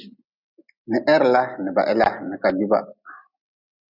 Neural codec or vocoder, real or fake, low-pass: none; real; 5.4 kHz